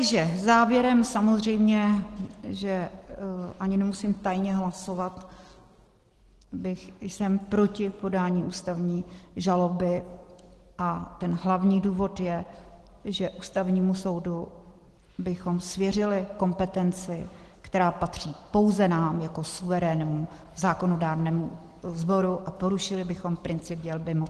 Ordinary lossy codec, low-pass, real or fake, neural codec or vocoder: Opus, 16 kbps; 10.8 kHz; real; none